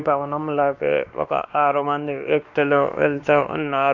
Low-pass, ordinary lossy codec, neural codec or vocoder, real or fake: 7.2 kHz; none; codec, 16 kHz, 2 kbps, X-Codec, WavLM features, trained on Multilingual LibriSpeech; fake